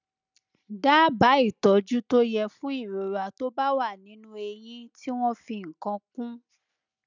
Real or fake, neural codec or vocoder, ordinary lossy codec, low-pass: real; none; none; 7.2 kHz